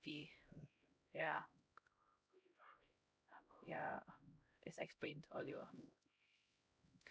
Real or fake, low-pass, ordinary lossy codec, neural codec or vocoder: fake; none; none; codec, 16 kHz, 0.5 kbps, X-Codec, HuBERT features, trained on LibriSpeech